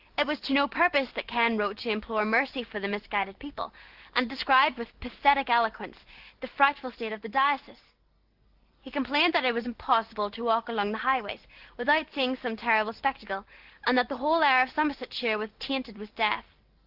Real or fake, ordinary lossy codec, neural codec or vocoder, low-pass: real; Opus, 16 kbps; none; 5.4 kHz